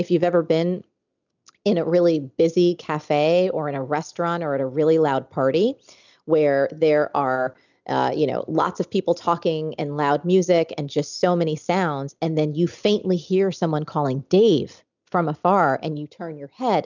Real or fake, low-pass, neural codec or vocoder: real; 7.2 kHz; none